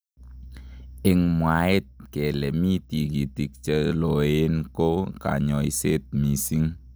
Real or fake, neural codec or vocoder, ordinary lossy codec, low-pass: real; none; none; none